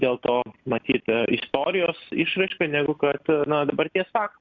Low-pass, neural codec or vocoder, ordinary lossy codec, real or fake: 7.2 kHz; none; Opus, 64 kbps; real